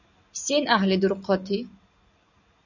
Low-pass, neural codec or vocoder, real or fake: 7.2 kHz; none; real